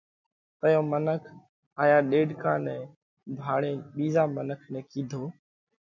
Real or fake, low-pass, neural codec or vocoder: real; 7.2 kHz; none